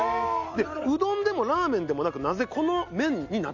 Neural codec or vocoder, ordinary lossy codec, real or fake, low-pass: none; none; real; 7.2 kHz